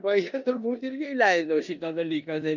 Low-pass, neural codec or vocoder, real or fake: 7.2 kHz; codec, 16 kHz in and 24 kHz out, 0.9 kbps, LongCat-Audio-Codec, four codebook decoder; fake